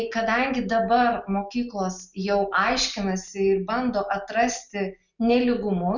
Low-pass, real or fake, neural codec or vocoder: 7.2 kHz; real; none